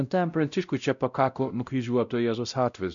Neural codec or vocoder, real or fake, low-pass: codec, 16 kHz, 0.5 kbps, X-Codec, WavLM features, trained on Multilingual LibriSpeech; fake; 7.2 kHz